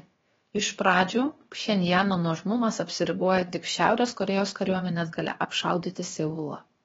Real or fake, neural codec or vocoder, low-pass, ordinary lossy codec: fake; codec, 16 kHz, about 1 kbps, DyCAST, with the encoder's durations; 7.2 kHz; AAC, 24 kbps